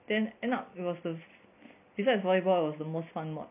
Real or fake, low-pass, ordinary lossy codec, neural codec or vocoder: real; 3.6 kHz; none; none